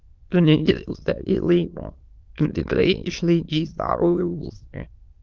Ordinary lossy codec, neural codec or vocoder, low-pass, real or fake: Opus, 32 kbps; autoencoder, 22.05 kHz, a latent of 192 numbers a frame, VITS, trained on many speakers; 7.2 kHz; fake